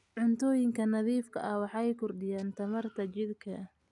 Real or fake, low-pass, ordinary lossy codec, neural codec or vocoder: real; 10.8 kHz; none; none